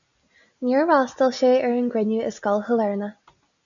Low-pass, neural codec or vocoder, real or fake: 7.2 kHz; none; real